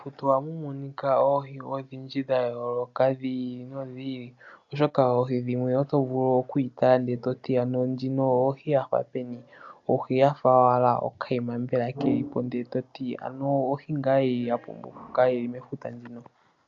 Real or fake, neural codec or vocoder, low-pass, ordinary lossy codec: real; none; 7.2 kHz; AAC, 64 kbps